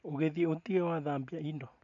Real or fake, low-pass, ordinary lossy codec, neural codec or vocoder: real; 7.2 kHz; none; none